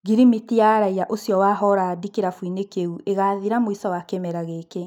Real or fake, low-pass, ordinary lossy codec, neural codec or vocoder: real; 19.8 kHz; none; none